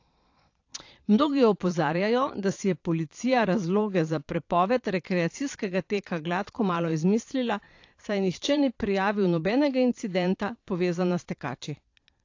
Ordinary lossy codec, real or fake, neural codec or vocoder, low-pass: AAC, 48 kbps; fake; vocoder, 22.05 kHz, 80 mel bands, Vocos; 7.2 kHz